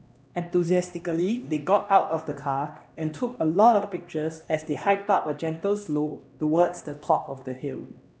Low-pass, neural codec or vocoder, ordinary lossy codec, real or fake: none; codec, 16 kHz, 1 kbps, X-Codec, HuBERT features, trained on LibriSpeech; none; fake